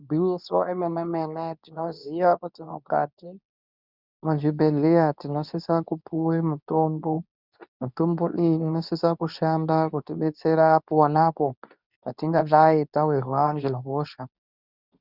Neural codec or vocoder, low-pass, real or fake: codec, 24 kHz, 0.9 kbps, WavTokenizer, medium speech release version 2; 5.4 kHz; fake